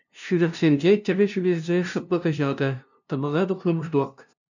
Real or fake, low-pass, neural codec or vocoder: fake; 7.2 kHz; codec, 16 kHz, 0.5 kbps, FunCodec, trained on LibriTTS, 25 frames a second